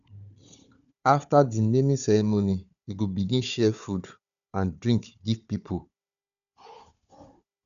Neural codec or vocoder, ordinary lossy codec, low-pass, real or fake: codec, 16 kHz, 4 kbps, FunCodec, trained on Chinese and English, 50 frames a second; none; 7.2 kHz; fake